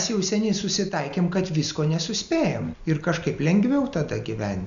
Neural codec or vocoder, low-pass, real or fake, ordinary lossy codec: none; 7.2 kHz; real; AAC, 96 kbps